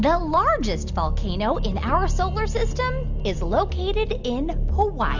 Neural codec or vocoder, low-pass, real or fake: none; 7.2 kHz; real